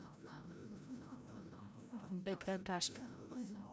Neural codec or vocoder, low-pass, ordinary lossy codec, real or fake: codec, 16 kHz, 0.5 kbps, FreqCodec, larger model; none; none; fake